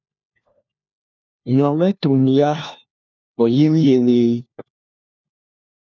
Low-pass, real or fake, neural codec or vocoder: 7.2 kHz; fake; codec, 16 kHz, 1 kbps, FunCodec, trained on LibriTTS, 50 frames a second